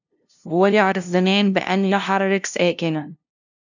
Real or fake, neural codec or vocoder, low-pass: fake; codec, 16 kHz, 0.5 kbps, FunCodec, trained on LibriTTS, 25 frames a second; 7.2 kHz